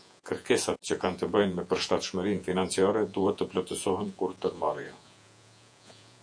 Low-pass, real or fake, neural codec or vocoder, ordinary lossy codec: 9.9 kHz; fake; vocoder, 48 kHz, 128 mel bands, Vocos; AAC, 64 kbps